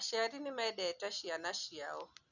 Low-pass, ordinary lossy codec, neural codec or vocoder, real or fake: 7.2 kHz; none; none; real